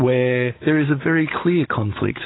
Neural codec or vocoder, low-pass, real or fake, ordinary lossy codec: codec, 16 kHz, 16 kbps, FunCodec, trained on Chinese and English, 50 frames a second; 7.2 kHz; fake; AAC, 16 kbps